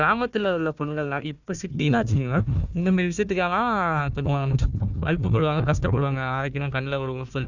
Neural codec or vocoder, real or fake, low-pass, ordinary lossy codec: codec, 16 kHz, 1 kbps, FunCodec, trained on Chinese and English, 50 frames a second; fake; 7.2 kHz; none